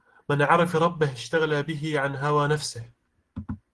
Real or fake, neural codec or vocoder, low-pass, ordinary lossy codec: real; none; 10.8 kHz; Opus, 16 kbps